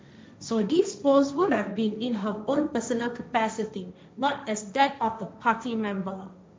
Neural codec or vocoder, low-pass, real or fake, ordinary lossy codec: codec, 16 kHz, 1.1 kbps, Voila-Tokenizer; none; fake; none